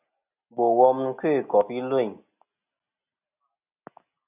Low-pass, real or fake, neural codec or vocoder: 3.6 kHz; real; none